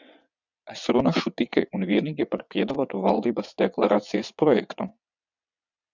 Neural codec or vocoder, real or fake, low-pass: vocoder, 22.05 kHz, 80 mel bands, WaveNeXt; fake; 7.2 kHz